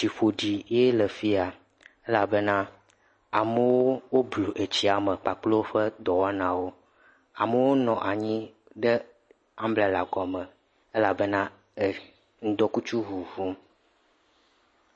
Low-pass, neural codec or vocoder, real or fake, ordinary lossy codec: 9.9 kHz; none; real; MP3, 32 kbps